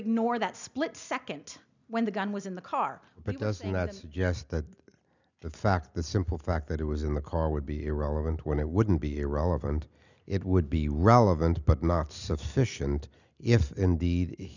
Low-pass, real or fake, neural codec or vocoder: 7.2 kHz; real; none